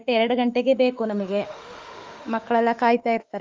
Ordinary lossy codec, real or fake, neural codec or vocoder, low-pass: Opus, 32 kbps; fake; autoencoder, 48 kHz, 32 numbers a frame, DAC-VAE, trained on Japanese speech; 7.2 kHz